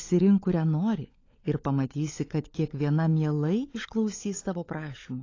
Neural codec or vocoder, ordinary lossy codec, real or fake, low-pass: codec, 16 kHz, 16 kbps, FunCodec, trained on LibriTTS, 50 frames a second; AAC, 32 kbps; fake; 7.2 kHz